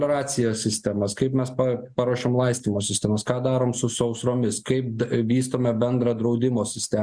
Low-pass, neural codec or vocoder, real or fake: 9.9 kHz; none; real